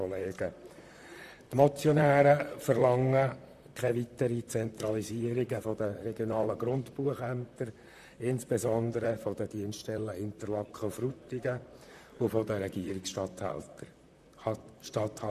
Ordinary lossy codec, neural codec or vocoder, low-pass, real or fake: none; vocoder, 44.1 kHz, 128 mel bands, Pupu-Vocoder; 14.4 kHz; fake